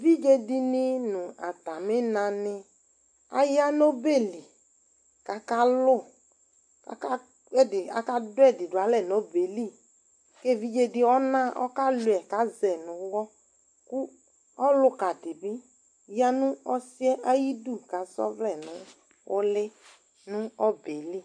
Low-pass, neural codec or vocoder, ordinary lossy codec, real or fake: 9.9 kHz; none; AAC, 64 kbps; real